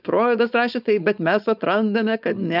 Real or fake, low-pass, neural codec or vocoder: fake; 5.4 kHz; codec, 16 kHz, 4.8 kbps, FACodec